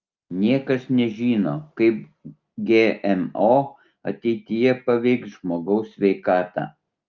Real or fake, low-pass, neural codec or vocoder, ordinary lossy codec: real; 7.2 kHz; none; Opus, 24 kbps